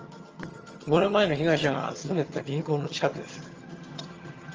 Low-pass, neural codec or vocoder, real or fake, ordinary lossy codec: 7.2 kHz; vocoder, 22.05 kHz, 80 mel bands, HiFi-GAN; fake; Opus, 24 kbps